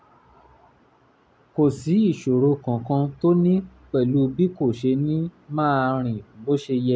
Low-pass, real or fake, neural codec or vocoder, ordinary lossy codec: none; real; none; none